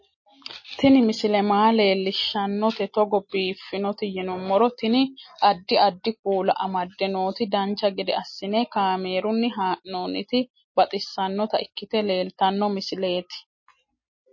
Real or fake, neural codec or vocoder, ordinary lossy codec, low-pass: real; none; MP3, 32 kbps; 7.2 kHz